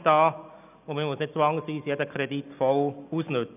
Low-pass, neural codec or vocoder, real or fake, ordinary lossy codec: 3.6 kHz; none; real; none